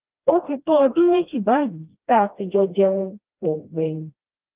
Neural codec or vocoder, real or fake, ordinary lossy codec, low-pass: codec, 16 kHz, 1 kbps, FreqCodec, smaller model; fake; Opus, 32 kbps; 3.6 kHz